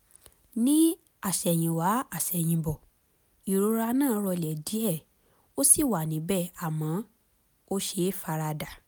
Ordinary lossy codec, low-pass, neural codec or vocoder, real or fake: none; none; none; real